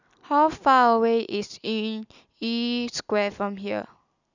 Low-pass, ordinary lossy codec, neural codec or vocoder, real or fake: 7.2 kHz; none; none; real